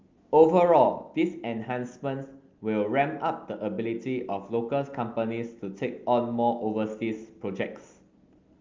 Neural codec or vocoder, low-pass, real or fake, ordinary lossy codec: none; 7.2 kHz; real; Opus, 32 kbps